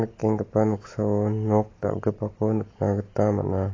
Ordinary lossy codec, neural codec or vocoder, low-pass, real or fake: AAC, 32 kbps; none; 7.2 kHz; real